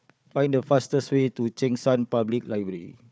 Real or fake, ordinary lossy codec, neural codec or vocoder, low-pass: fake; none; codec, 16 kHz, 4 kbps, FunCodec, trained on Chinese and English, 50 frames a second; none